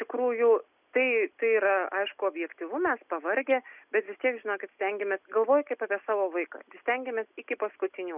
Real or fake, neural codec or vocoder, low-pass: real; none; 3.6 kHz